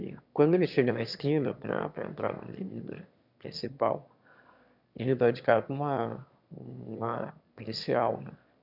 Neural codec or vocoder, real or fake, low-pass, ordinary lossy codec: autoencoder, 22.05 kHz, a latent of 192 numbers a frame, VITS, trained on one speaker; fake; 5.4 kHz; none